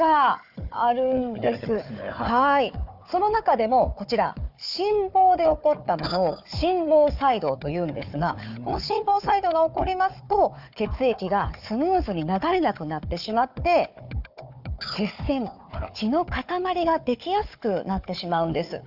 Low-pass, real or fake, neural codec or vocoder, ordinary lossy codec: 5.4 kHz; fake; codec, 16 kHz, 4 kbps, FunCodec, trained on Chinese and English, 50 frames a second; none